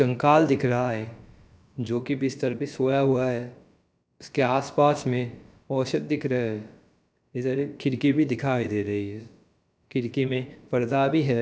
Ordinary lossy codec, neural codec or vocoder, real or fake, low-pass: none; codec, 16 kHz, about 1 kbps, DyCAST, with the encoder's durations; fake; none